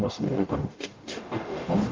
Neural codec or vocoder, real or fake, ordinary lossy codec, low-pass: codec, 44.1 kHz, 0.9 kbps, DAC; fake; Opus, 32 kbps; 7.2 kHz